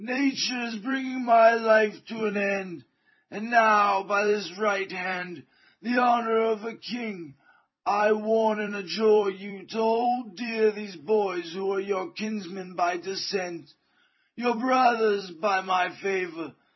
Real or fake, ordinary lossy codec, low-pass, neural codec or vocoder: fake; MP3, 24 kbps; 7.2 kHz; vocoder, 44.1 kHz, 128 mel bands every 256 samples, BigVGAN v2